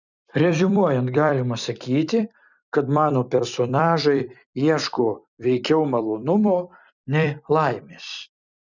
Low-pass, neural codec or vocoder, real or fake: 7.2 kHz; vocoder, 44.1 kHz, 128 mel bands every 256 samples, BigVGAN v2; fake